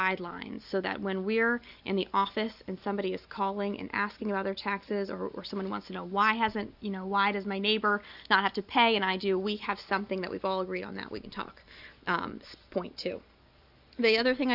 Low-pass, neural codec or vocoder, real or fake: 5.4 kHz; none; real